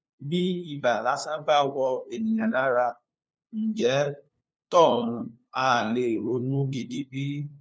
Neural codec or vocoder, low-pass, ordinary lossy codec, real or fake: codec, 16 kHz, 2 kbps, FunCodec, trained on LibriTTS, 25 frames a second; none; none; fake